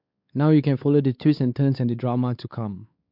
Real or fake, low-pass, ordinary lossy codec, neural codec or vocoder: fake; 5.4 kHz; MP3, 48 kbps; codec, 16 kHz, 4 kbps, X-Codec, WavLM features, trained on Multilingual LibriSpeech